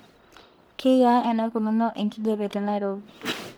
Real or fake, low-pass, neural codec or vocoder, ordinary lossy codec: fake; none; codec, 44.1 kHz, 1.7 kbps, Pupu-Codec; none